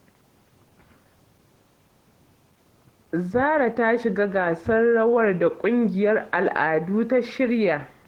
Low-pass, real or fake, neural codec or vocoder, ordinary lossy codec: 19.8 kHz; fake; codec, 44.1 kHz, 7.8 kbps, DAC; Opus, 16 kbps